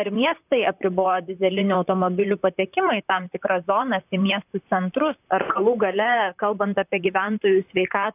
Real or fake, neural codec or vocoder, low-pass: fake; vocoder, 44.1 kHz, 128 mel bands, Pupu-Vocoder; 3.6 kHz